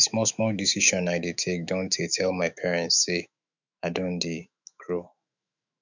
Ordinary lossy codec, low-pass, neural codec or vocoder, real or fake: none; 7.2 kHz; autoencoder, 48 kHz, 128 numbers a frame, DAC-VAE, trained on Japanese speech; fake